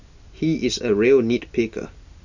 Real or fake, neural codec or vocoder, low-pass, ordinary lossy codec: real; none; 7.2 kHz; none